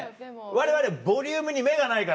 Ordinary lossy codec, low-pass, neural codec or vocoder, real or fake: none; none; none; real